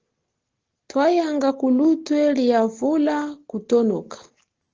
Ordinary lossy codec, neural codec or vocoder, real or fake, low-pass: Opus, 16 kbps; none; real; 7.2 kHz